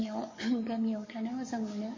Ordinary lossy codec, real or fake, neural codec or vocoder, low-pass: MP3, 32 kbps; fake; codec, 44.1 kHz, 7.8 kbps, DAC; 7.2 kHz